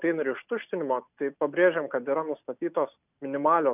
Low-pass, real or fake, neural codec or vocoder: 3.6 kHz; real; none